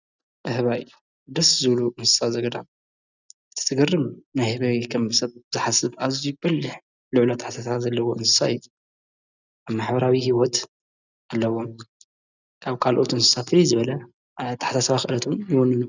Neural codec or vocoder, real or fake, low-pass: none; real; 7.2 kHz